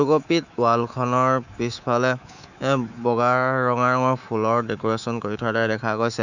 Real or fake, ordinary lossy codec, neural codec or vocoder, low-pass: fake; none; codec, 24 kHz, 3.1 kbps, DualCodec; 7.2 kHz